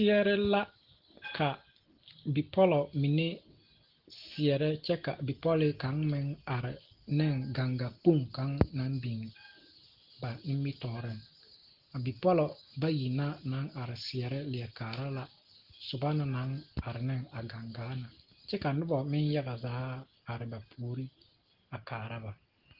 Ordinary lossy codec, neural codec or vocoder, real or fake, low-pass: Opus, 16 kbps; none; real; 5.4 kHz